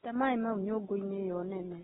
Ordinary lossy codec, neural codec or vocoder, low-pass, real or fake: AAC, 16 kbps; none; 19.8 kHz; real